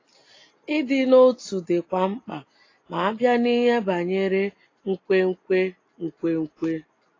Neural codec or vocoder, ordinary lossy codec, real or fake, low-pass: none; AAC, 32 kbps; real; 7.2 kHz